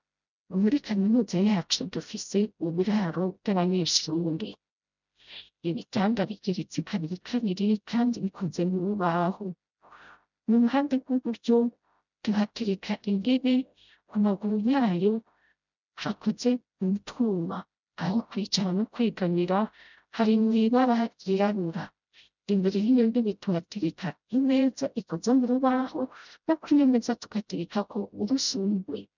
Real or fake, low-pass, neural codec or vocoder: fake; 7.2 kHz; codec, 16 kHz, 0.5 kbps, FreqCodec, smaller model